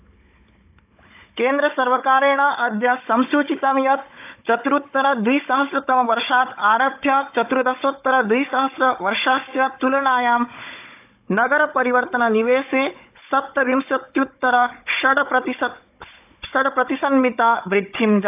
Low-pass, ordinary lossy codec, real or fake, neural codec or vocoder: 3.6 kHz; AAC, 32 kbps; fake; codec, 16 kHz, 16 kbps, FunCodec, trained on Chinese and English, 50 frames a second